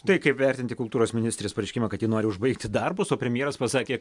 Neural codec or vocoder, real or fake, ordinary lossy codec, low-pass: none; real; MP3, 64 kbps; 10.8 kHz